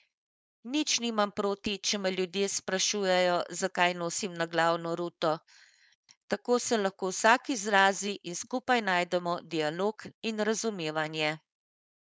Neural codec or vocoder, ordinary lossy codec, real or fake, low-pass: codec, 16 kHz, 4.8 kbps, FACodec; none; fake; none